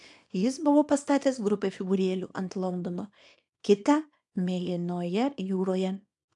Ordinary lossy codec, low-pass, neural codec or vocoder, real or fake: AAC, 64 kbps; 10.8 kHz; codec, 24 kHz, 0.9 kbps, WavTokenizer, small release; fake